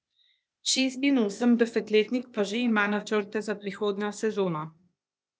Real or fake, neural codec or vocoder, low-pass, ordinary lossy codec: fake; codec, 16 kHz, 0.8 kbps, ZipCodec; none; none